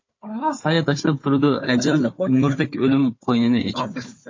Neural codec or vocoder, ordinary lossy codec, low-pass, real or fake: codec, 16 kHz, 4 kbps, FunCodec, trained on Chinese and English, 50 frames a second; MP3, 32 kbps; 7.2 kHz; fake